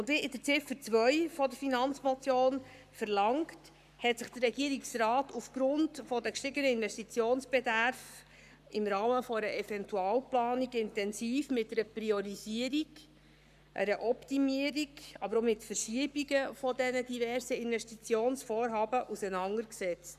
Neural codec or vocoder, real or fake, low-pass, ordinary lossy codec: codec, 44.1 kHz, 7.8 kbps, Pupu-Codec; fake; 14.4 kHz; none